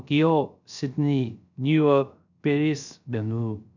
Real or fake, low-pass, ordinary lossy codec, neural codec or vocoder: fake; 7.2 kHz; none; codec, 16 kHz, 0.3 kbps, FocalCodec